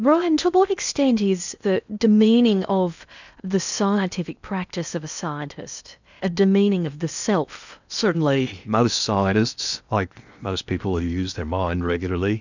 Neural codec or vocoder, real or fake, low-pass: codec, 16 kHz in and 24 kHz out, 0.8 kbps, FocalCodec, streaming, 65536 codes; fake; 7.2 kHz